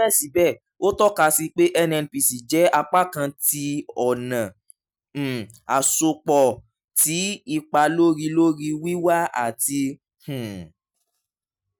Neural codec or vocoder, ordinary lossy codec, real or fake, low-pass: none; none; real; none